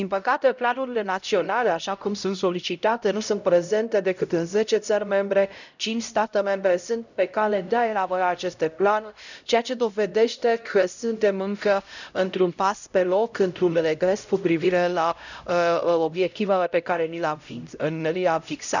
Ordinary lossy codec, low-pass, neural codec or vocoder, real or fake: none; 7.2 kHz; codec, 16 kHz, 0.5 kbps, X-Codec, HuBERT features, trained on LibriSpeech; fake